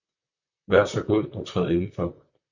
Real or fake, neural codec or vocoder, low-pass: fake; vocoder, 44.1 kHz, 128 mel bands, Pupu-Vocoder; 7.2 kHz